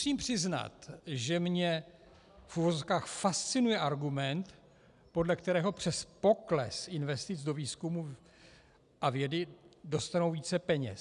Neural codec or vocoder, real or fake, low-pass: none; real; 10.8 kHz